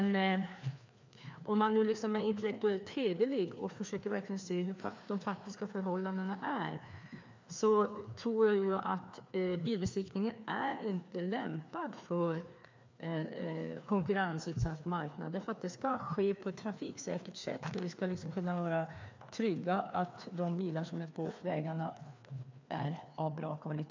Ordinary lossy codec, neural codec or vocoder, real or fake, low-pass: MP3, 64 kbps; codec, 16 kHz, 2 kbps, FreqCodec, larger model; fake; 7.2 kHz